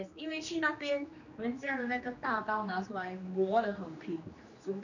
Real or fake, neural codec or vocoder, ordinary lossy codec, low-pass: fake; codec, 16 kHz, 2 kbps, X-Codec, HuBERT features, trained on general audio; none; 7.2 kHz